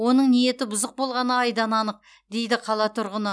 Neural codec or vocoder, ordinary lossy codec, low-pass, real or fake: none; none; none; real